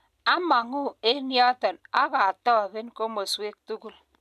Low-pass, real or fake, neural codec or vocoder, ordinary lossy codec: 14.4 kHz; real; none; none